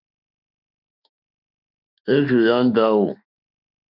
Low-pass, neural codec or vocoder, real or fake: 5.4 kHz; autoencoder, 48 kHz, 32 numbers a frame, DAC-VAE, trained on Japanese speech; fake